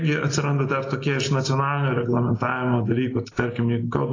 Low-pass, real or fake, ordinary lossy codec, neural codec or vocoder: 7.2 kHz; real; AAC, 32 kbps; none